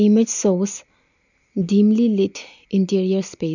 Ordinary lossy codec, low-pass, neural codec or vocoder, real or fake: none; 7.2 kHz; none; real